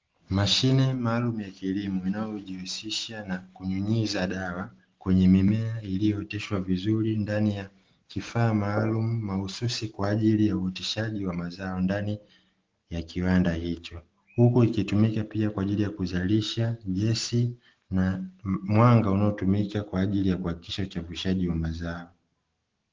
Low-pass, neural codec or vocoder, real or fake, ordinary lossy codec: 7.2 kHz; none; real; Opus, 16 kbps